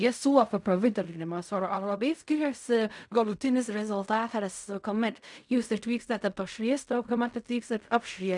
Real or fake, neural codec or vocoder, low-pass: fake; codec, 16 kHz in and 24 kHz out, 0.4 kbps, LongCat-Audio-Codec, fine tuned four codebook decoder; 10.8 kHz